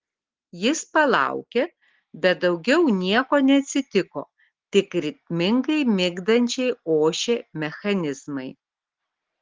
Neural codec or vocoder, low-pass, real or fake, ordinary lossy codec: none; 7.2 kHz; real; Opus, 16 kbps